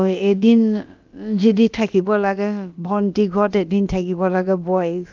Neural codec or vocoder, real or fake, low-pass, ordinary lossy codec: codec, 16 kHz, about 1 kbps, DyCAST, with the encoder's durations; fake; 7.2 kHz; Opus, 24 kbps